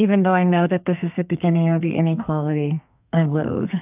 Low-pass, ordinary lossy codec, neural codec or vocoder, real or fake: 3.6 kHz; AAC, 32 kbps; codec, 32 kHz, 1.9 kbps, SNAC; fake